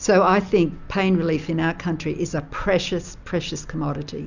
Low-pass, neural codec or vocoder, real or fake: 7.2 kHz; none; real